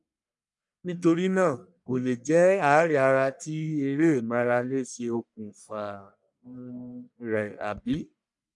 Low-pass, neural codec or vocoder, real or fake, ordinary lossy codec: 10.8 kHz; codec, 44.1 kHz, 1.7 kbps, Pupu-Codec; fake; none